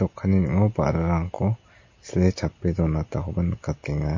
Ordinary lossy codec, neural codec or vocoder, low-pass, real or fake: MP3, 32 kbps; none; 7.2 kHz; real